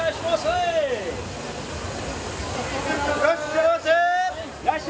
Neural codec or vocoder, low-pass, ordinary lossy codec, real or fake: none; none; none; real